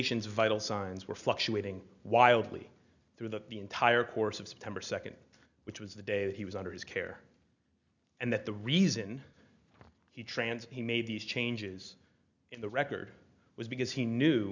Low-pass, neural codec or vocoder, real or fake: 7.2 kHz; none; real